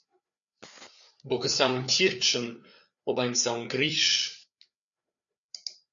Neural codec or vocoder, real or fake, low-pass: codec, 16 kHz, 4 kbps, FreqCodec, larger model; fake; 7.2 kHz